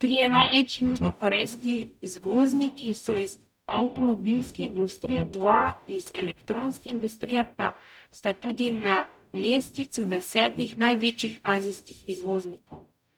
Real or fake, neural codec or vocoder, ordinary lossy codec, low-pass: fake; codec, 44.1 kHz, 0.9 kbps, DAC; none; 19.8 kHz